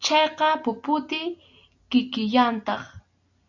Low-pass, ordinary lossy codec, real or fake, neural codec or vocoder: 7.2 kHz; AAC, 48 kbps; real; none